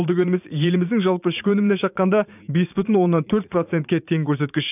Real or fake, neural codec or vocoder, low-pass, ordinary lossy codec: fake; vocoder, 44.1 kHz, 80 mel bands, Vocos; 3.6 kHz; none